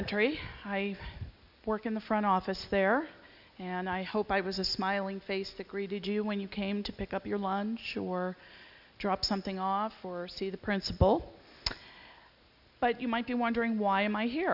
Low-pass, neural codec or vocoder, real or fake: 5.4 kHz; none; real